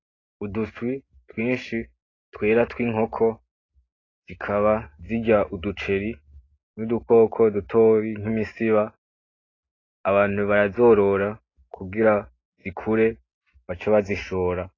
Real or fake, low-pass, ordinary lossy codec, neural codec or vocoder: real; 7.2 kHz; AAC, 32 kbps; none